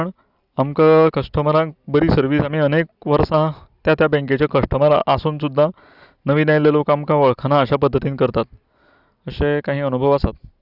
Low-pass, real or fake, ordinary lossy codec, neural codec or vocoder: 5.4 kHz; real; Opus, 64 kbps; none